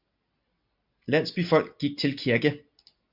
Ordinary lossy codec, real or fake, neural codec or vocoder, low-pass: MP3, 48 kbps; real; none; 5.4 kHz